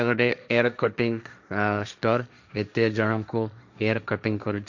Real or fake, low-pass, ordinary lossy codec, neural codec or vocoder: fake; 7.2 kHz; none; codec, 16 kHz, 1.1 kbps, Voila-Tokenizer